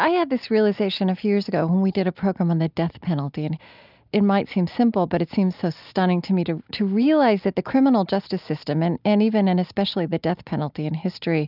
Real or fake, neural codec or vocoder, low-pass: real; none; 5.4 kHz